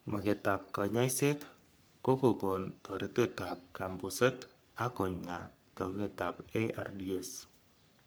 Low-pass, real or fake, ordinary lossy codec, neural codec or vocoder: none; fake; none; codec, 44.1 kHz, 3.4 kbps, Pupu-Codec